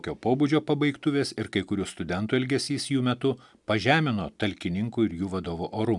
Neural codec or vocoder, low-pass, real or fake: none; 10.8 kHz; real